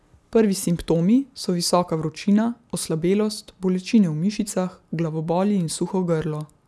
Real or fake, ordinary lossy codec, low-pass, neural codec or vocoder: real; none; none; none